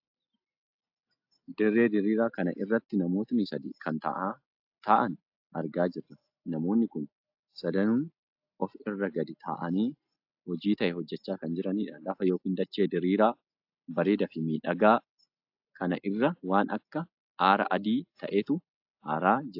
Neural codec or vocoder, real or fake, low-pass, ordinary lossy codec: none; real; 5.4 kHz; AAC, 48 kbps